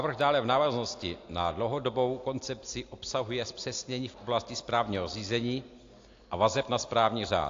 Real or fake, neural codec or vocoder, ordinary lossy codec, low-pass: real; none; AAC, 48 kbps; 7.2 kHz